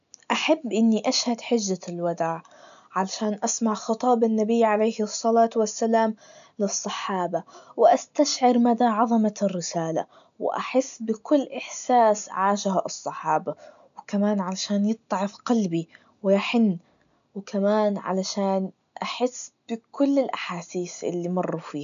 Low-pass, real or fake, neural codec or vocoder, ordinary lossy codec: 7.2 kHz; real; none; none